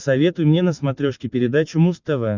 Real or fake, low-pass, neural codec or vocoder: real; 7.2 kHz; none